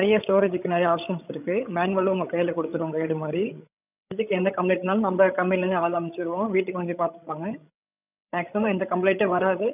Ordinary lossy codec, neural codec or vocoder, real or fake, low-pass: none; codec, 16 kHz, 16 kbps, FreqCodec, larger model; fake; 3.6 kHz